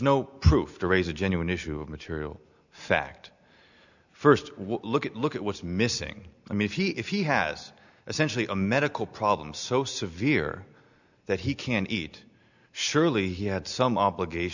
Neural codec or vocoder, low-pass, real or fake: none; 7.2 kHz; real